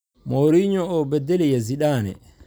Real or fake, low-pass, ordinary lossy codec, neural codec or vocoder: real; none; none; none